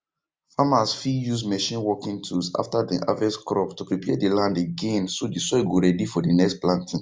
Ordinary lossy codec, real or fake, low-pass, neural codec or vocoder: none; real; none; none